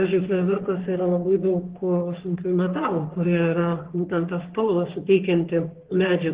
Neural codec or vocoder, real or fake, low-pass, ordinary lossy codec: codec, 44.1 kHz, 3.4 kbps, Pupu-Codec; fake; 3.6 kHz; Opus, 16 kbps